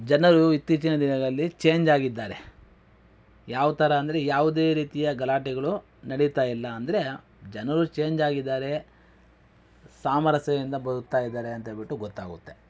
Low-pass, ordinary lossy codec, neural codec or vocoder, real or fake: none; none; none; real